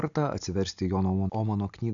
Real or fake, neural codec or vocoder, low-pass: real; none; 7.2 kHz